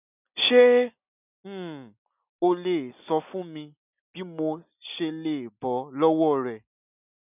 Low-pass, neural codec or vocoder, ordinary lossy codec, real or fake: 3.6 kHz; none; none; real